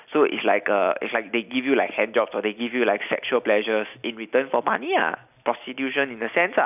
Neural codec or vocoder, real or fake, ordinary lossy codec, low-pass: none; real; none; 3.6 kHz